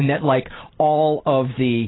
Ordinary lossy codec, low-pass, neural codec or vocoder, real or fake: AAC, 16 kbps; 7.2 kHz; none; real